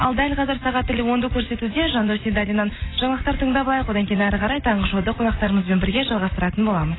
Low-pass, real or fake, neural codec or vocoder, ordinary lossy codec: 7.2 kHz; real; none; AAC, 16 kbps